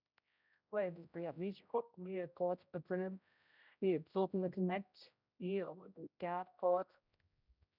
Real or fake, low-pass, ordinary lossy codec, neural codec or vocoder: fake; 5.4 kHz; none; codec, 16 kHz, 0.5 kbps, X-Codec, HuBERT features, trained on general audio